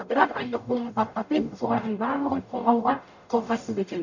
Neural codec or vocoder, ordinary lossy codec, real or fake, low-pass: codec, 44.1 kHz, 0.9 kbps, DAC; none; fake; 7.2 kHz